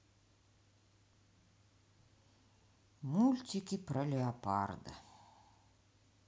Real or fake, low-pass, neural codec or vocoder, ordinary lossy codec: real; none; none; none